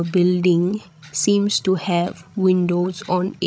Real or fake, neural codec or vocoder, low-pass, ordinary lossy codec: fake; codec, 16 kHz, 16 kbps, FreqCodec, larger model; none; none